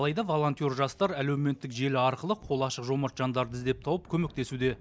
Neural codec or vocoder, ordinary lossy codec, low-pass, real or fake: none; none; none; real